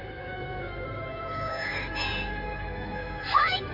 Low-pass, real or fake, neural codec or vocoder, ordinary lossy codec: 5.4 kHz; fake; codec, 16 kHz in and 24 kHz out, 2.2 kbps, FireRedTTS-2 codec; AAC, 48 kbps